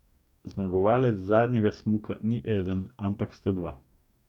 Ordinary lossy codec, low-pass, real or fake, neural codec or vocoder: none; 19.8 kHz; fake; codec, 44.1 kHz, 2.6 kbps, DAC